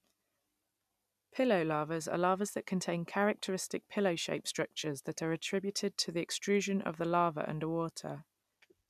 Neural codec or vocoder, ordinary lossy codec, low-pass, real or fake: none; none; 14.4 kHz; real